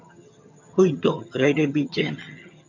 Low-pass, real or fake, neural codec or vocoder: 7.2 kHz; fake; vocoder, 22.05 kHz, 80 mel bands, HiFi-GAN